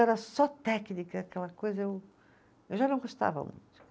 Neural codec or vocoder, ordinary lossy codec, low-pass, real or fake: none; none; none; real